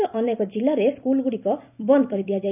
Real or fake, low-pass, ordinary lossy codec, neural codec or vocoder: fake; 3.6 kHz; none; vocoder, 44.1 kHz, 128 mel bands every 256 samples, BigVGAN v2